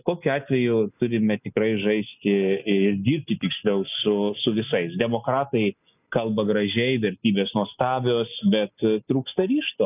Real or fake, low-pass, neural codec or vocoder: real; 3.6 kHz; none